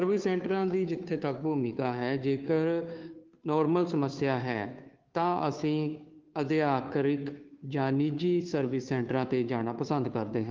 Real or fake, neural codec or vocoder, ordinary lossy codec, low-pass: fake; codec, 16 kHz, 2 kbps, FunCodec, trained on Chinese and English, 25 frames a second; Opus, 32 kbps; 7.2 kHz